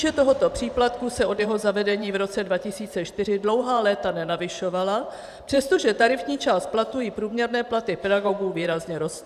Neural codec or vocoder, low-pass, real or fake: vocoder, 44.1 kHz, 128 mel bands every 512 samples, BigVGAN v2; 14.4 kHz; fake